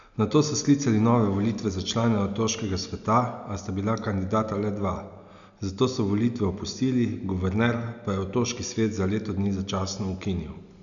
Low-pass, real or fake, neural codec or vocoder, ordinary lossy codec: 7.2 kHz; real; none; none